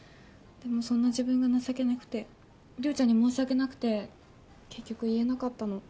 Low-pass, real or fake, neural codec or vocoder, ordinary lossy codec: none; real; none; none